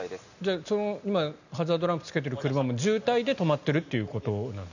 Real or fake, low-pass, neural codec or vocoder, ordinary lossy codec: real; 7.2 kHz; none; none